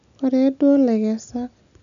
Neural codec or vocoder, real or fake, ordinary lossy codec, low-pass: none; real; none; 7.2 kHz